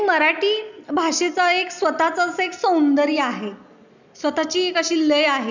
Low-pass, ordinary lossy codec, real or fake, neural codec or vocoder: 7.2 kHz; none; real; none